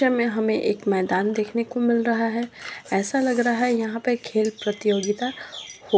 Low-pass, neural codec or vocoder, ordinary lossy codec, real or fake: none; none; none; real